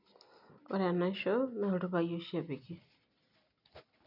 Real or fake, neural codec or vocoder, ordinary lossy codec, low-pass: real; none; none; 5.4 kHz